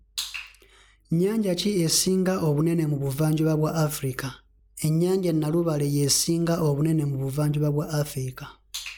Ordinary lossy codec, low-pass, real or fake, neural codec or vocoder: none; none; real; none